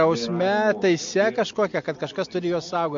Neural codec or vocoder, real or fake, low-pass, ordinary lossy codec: none; real; 7.2 kHz; MP3, 48 kbps